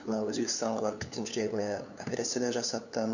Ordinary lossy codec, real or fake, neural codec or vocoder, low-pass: none; fake; codec, 16 kHz, 2 kbps, FunCodec, trained on LibriTTS, 25 frames a second; 7.2 kHz